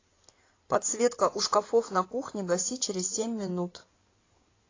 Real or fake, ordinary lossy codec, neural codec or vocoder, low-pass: fake; AAC, 32 kbps; codec, 16 kHz in and 24 kHz out, 2.2 kbps, FireRedTTS-2 codec; 7.2 kHz